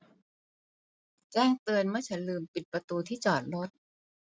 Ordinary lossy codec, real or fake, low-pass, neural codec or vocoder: none; real; none; none